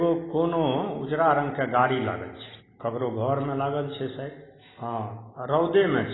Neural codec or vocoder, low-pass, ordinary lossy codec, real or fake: none; 7.2 kHz; AAC, 16 kbps; real